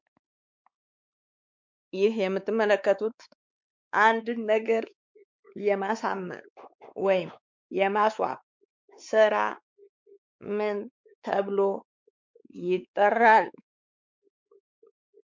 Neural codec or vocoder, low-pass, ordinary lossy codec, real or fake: codec, 16 kHz, 4 kbps, X-Codec, WavLM features, trained on Multilingual LibriSpeech; 7.2 kHz; MP3, 64 kbps; fake